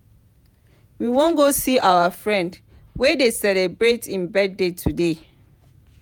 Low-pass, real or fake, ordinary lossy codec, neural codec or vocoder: none; fake; none; vocoder, 48 kHz, 128 mel bands, Vocos